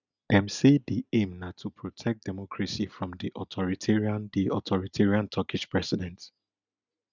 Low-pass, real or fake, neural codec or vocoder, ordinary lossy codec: 7.2 kHz; real; none; none